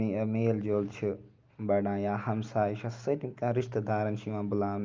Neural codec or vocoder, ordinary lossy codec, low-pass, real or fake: none; Opus, 24 kbps; 7.2 kHz; real